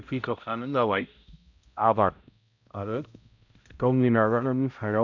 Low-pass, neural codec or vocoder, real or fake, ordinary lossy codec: 7.2 kHz; codec, 16 kHz, 0.5 kbps, X-Codec, HuBERT features, trained on balanced general audio; fake; none